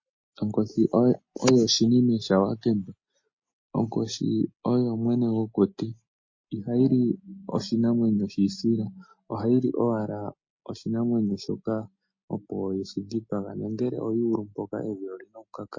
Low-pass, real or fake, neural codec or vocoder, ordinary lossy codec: 7.2 kHz; real; none; MP3, 32 kbps